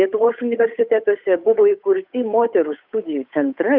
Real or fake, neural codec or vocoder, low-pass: fake; codec, 16 kHz, 8 kbps, FunCodec, trained on Chinese and English, 25 frames a second; 5.4 kHz